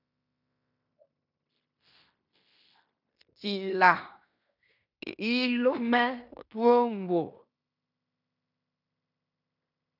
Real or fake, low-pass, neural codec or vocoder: fake; 5.4 kHz; codec, 16 kHz in and 24 kHz out, 0.9 kbps, LongCat-Audio-Codec, fine tuned four codebook decoder